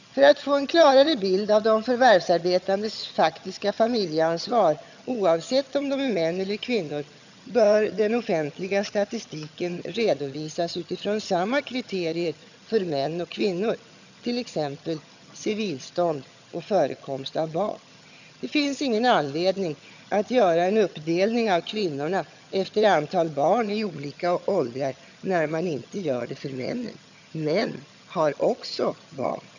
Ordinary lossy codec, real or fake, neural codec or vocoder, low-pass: none; fake; vocoder, 22.05 kHz, 80 mel bands, HiFi-GAN; 7.2 kHz